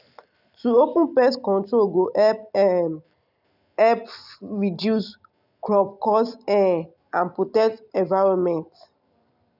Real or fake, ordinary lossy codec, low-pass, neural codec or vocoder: real; none; 5.4 kHz; none